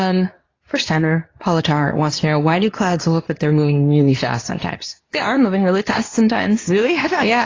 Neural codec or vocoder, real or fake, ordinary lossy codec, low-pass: codec, 24 kHz, 0.9 kbps, WavTokenizer, medium speech release version 2; fake; AAC, 32 kbps; 7.2 kHz